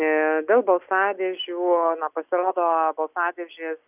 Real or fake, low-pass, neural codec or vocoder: real; 3.6 kHz; none